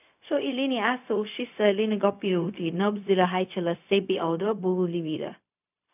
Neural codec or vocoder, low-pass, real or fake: codec, 16 kHz, 0.4 kbps, LongCat-Audio-Codec; 3.6 kHz; fake